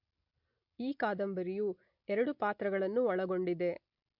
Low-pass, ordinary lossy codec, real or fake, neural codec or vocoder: 5.4 kHz; MP3, 48 kbps; real; none